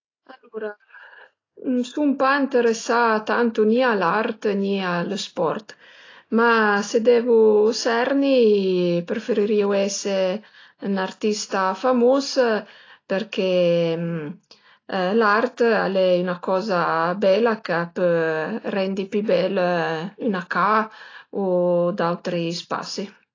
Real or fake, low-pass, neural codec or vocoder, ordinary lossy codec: real; 7.2 kHz; none; AAC, 32 kbps